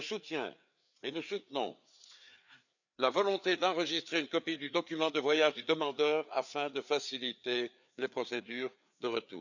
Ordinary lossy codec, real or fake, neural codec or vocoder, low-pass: none; fake; codec, 16 kHz, 4 kbps, FreqCodec, larger model; 7.2 kHz